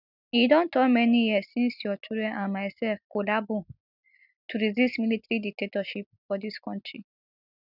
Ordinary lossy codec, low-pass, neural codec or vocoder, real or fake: none; 5.4 kHz; none; real